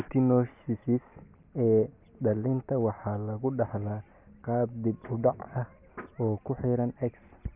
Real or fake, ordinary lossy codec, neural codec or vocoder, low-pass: real; Opus, 64 kbps; none; 3.6 kHz